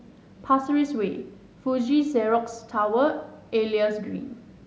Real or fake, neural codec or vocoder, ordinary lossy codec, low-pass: real; none; none; none